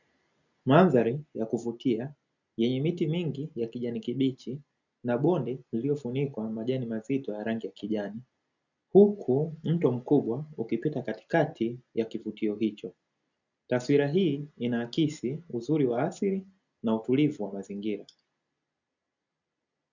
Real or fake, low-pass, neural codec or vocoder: real; 7.2 kHz; none